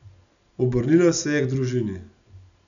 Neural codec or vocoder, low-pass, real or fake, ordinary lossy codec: none; 7.2 kHz; real; none